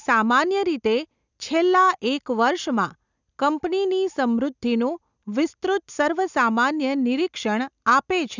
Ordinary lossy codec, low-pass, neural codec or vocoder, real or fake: none; 7.2 kHz; none; real